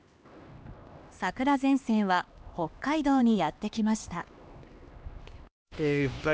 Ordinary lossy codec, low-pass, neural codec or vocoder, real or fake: none; none; codec, 16 kHz, 2 kbps, X-Codec, HuBERT features, trained on LibriSpeech; fake